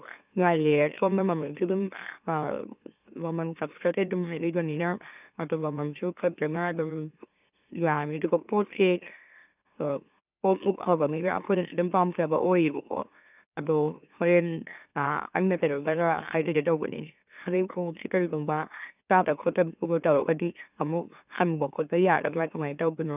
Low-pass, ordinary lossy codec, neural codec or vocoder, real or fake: 3.6 kHz; none; autoencoder, 44.1 kHz, a latent of 192 numbers a frame, MeloTTS; fake